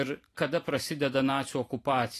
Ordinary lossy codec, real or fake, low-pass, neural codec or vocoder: AAC, 48 kbps; fake; 14.4 kHz; vocoder, 44.1 kHz, 128 mel bands every 512 samples, BigVGAN v2